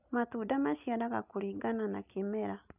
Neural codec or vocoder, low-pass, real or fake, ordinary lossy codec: none; 3.6 kHz; real; none